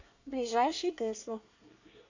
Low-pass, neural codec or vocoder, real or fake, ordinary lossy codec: 7.2 kHz; codec, 24 kHz, 1 kbps, SNAC; fake; AAC, 48 kbps